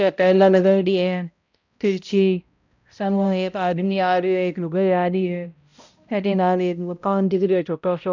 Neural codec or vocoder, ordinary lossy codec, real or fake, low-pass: codec, 16 kHz, 0.5 kbps, X-Codec, HuBERT features, trained on balanced general audio; none; fake; 7.2 kHz